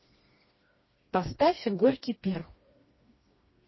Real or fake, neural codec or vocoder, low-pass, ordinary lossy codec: fake; codec, 16 kHz, 2 kbps, FreqCodec, smaller model; 7.2 kHz; MP3, 24 kbps